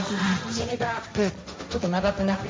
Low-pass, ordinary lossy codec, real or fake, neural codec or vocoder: none; none; fake; codec, 16 kHz, 1.1 kbps, Voila-Tokenizer